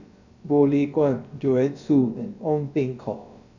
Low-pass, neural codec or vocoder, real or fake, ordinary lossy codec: 7.2 kHz; codec, 16 kHz, about 1 kbps, DyCAST, with the encoder's durations; fake; none